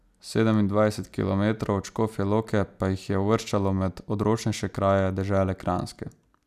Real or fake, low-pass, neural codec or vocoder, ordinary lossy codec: real; 14.4 kHz; none; none